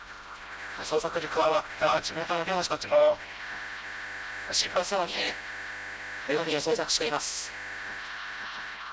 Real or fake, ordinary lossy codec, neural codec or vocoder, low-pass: fake; none; codec, 16 kHz, 0.5 kbps, FreqCodec, smaller model; none